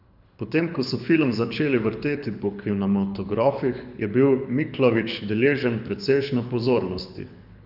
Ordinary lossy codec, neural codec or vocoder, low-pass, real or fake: none; codec, 24 kHz, 6 kbps, HILCodec; 5.4 kHz; fake